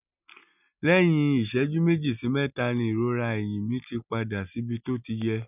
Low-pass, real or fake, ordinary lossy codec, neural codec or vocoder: 3.6 kHz; real; none; none